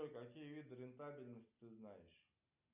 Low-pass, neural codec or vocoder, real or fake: 3.6 kHz; none; real